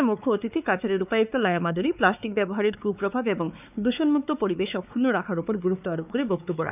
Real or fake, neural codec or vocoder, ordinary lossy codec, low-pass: fake; codec, 16 kHz, 4 kbps, X-Codec, WavLM features, trained on Multilingual LibriSpeech; none; 3.6 kHz